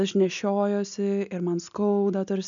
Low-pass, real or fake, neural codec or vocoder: 7.2 kHz; real; none